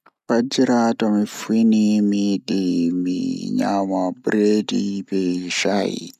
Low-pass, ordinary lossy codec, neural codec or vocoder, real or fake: 14.4 kHz; none; none; real